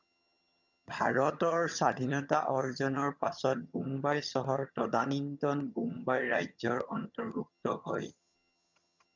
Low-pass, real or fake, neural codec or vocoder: 7.2 kHz; fake; vocoder, 22.05 kHz, 80 mel bands, HiFi-GAN